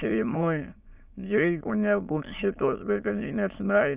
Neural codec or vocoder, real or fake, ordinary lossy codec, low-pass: autoencoder, 22.05 kHz, a latent of 192 numbers a frame, VITS, trained on many speakers; fake; Opus, 64 kbps; 3.6 kHz